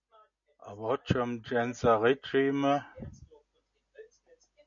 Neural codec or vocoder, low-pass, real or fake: none; 7.2 kHz; real